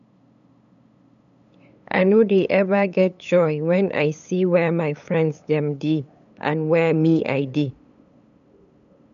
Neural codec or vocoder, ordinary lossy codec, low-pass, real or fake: codec, 16 kHz, 8 kbps, FunCodec, trained on LibriTTS, 25 frames a second; none; 7.2 kHz; fake